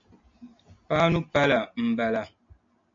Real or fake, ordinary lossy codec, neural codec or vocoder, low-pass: real; MP3, 48 kbps; none; 7.2 kHz